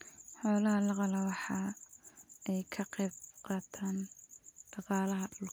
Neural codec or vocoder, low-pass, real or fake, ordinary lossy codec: none; none; real; none